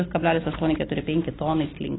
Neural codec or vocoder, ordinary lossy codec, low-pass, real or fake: none; AAC, 16 kbps; 7.2 kHz; real